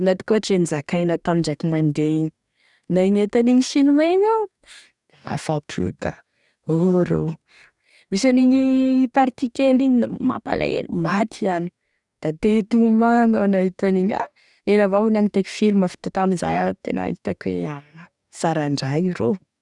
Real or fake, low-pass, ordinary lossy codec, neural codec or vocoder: fake; 10.8 kHz; none; codec, 44.1 kHz, 2.6 kbps, DAC